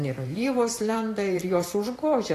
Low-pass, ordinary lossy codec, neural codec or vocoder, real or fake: 14.4 kHz; AAC, 48 kbps; vocoder, 44.1 kHz, 128 mel bands every 512 samples, BigVGAN v2; fake